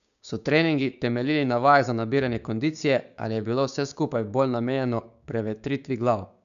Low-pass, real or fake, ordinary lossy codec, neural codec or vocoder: 7.2 kHz; fake; none; codec, 16 kHz, 6 kbps, DAC